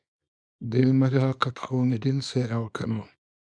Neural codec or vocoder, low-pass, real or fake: codec, 24 kHz, 0.9 kbps, WavTokenizer, small release; 9.9 kHz; fake